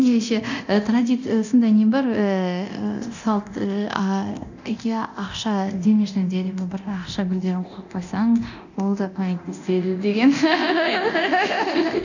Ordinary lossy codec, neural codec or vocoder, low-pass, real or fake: none; codec, 24 kHz, 0.9 kbps, DualCodec; 7.2 kHz; fake